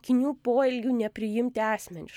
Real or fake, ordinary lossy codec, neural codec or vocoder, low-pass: real; MP3, 96 kbps; none; 19.8 kHz